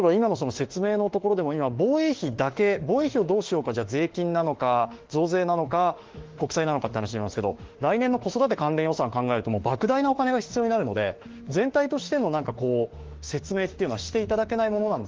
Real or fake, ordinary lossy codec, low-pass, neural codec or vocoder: fake; Opus, 16 kbps; 7.2 kHz; autoencoder, 48 kHz, 32 numbers a frame, DAC-VAE, trained on Japanese speech